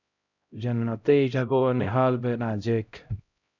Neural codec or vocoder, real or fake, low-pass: codec, 16 kHz, 0.5 kbps, X-Codec, HuBERT features, trained on LibriSpeech; fake; 7.2 kHz